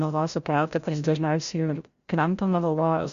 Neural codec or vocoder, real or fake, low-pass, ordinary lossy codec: codec, 16 kHz, 0.5 kbps, FreqCodec, larger model; fake; 7.2 kHz; Opus, 64 kbps